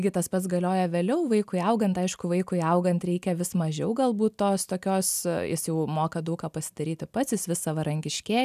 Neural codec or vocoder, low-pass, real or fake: none; 14.4 kHz; real